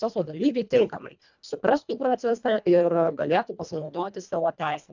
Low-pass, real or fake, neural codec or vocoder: 7.2 kHz; fake; codec, 24 kHz, 1.5 kbps, HILCodec